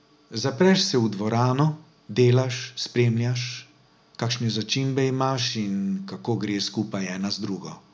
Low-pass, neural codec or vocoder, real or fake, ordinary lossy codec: none; none; real; none